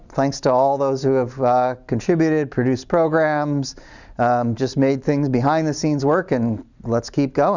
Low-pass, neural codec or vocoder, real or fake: 7.2 kHz; none; real